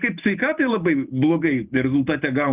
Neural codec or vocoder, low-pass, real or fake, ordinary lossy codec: none; 3.6 kHz; real; Opus, 24 kbps